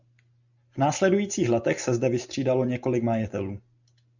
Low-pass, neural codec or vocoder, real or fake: 7.2 kHz; none; real